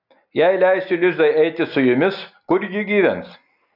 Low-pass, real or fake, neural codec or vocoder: 5.4 kHz; real; none